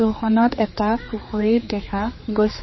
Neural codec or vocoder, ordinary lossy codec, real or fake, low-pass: codec, 16 kHz, 4 kbps, X-Codec, HuBERT features, trained on general audio; MP3, 24 kbps; fake; 7.2 kHz